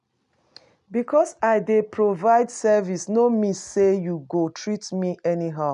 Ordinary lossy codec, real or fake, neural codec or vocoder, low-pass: none; real; none; 10.8 kHz